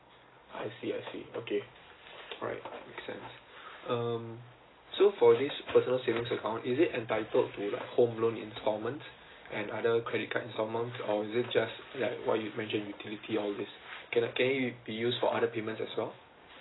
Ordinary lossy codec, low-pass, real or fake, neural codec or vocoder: AAC, 16 kbps; 7.2 kHz; real; none